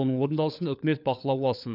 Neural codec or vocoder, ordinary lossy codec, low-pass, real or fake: codec, 16 kHz, 4 kbps, FunCodec, trained on LibriTTS, 50 frames a second; none; 5.4 kHz; fake